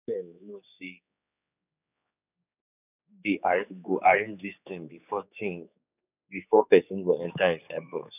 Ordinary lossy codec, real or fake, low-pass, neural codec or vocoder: none; fake; 3.6 kHz; codec, 16 kHz, 4 kbps, X-Codec, HuBERT features, trained on general audio